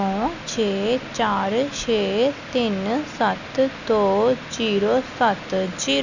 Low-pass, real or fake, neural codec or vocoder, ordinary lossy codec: 7.2 kHz; real; none; none